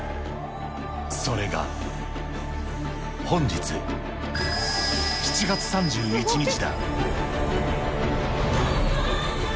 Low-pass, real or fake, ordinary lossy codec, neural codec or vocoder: none; real; none; none